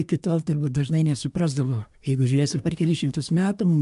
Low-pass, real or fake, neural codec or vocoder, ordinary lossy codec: 10.8 kHz; fake; codec, 24 kHz, 1 kbps, SNAC; AAC, 96 kbps